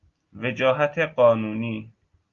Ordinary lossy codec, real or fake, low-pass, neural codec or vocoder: Opus, 32 kbps; real; 7.2 kHz; none